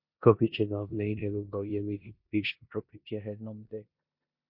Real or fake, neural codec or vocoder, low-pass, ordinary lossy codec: fake; codec, 16 kHz in and 24 kHz out, 0.9 kbps, LongCat-Audio-Codec, four codebook decoder; 5.4 kHz; MP3, 32 kbps